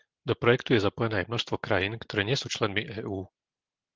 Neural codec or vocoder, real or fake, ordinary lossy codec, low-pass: none; real; Opus, 16 kbps; 7.2 kHz